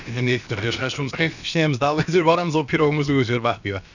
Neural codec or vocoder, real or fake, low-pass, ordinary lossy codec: codec, 16 kHz, about 1 kbps, DyCAST, with the encoder's durations; fake; 7.2 kHz; none